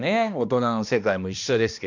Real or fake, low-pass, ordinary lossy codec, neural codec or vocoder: fake; 7.2 kHz; none; codec, 16 kHz, 1 kbps, X-Codec, HuBERT features, trained on balanced general audio